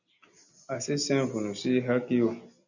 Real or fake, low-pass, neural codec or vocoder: real; 7.2 kHz; none